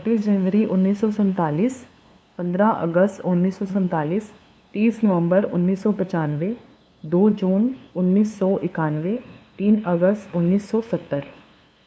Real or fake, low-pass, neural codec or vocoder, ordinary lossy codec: fake; none; codec, 16 kHz, 2 kbps, FunCodec, trained on LibriTTS, 25 frames a second; none